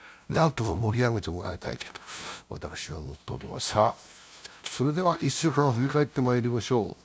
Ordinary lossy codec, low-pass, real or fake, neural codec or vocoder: none; none; fake; codec, 16 kHz, 0.5 kbps, FunCodec, trained on LibriTTS, 25 frames a second